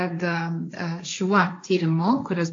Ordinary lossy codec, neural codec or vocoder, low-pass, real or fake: AAC, 48 kbps; codec, 16 kHz, 1.1 kbps, Voila-Tokenizer; 7.2 kHz; fake